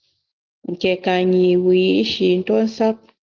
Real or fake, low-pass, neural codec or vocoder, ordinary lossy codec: real; 7.2 kHz; none; Opus, 24 kbps